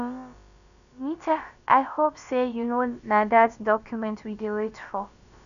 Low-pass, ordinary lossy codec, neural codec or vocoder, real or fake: 7.2 kHz; none; codec, 16 kHz, about 1 kbps, DyCAST, with the encoder's durations; fake